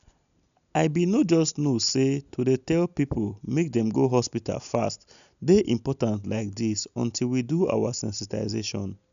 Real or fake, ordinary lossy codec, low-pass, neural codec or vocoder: real; none; 7.2 kHz; none